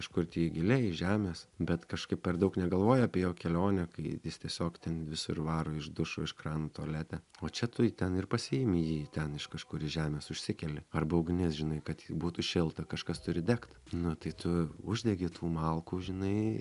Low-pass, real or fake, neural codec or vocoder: 10.8 kHz; real; none